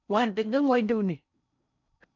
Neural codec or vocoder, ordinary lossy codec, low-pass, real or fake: codec, 16 kHz in and 24 kHz out, 0.6 kbps, FocalCodec, streaming, 4096 codes; none; 7.2 kHz; fake